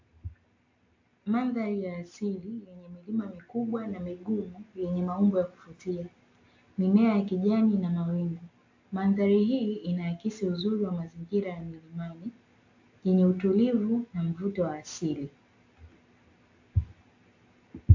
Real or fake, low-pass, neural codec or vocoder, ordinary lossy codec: real; 7.2 kHz; none; AAC, 48 kbps